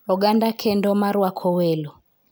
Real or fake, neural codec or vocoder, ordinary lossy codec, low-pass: real; none; none; none